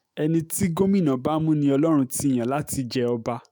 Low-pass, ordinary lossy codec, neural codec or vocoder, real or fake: none; none; autoencoder, 48 kHz, 128 numbers a frame, DAC-VAE, trained on Japanese speech; fake